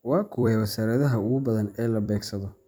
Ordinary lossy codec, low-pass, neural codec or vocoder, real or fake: none; none; vocoder, 44.1 kHz, 128 mel bands every 256 samples, BigVGAN v2; fake